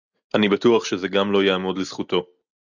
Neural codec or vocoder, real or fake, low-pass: none; real; 7.2 kHz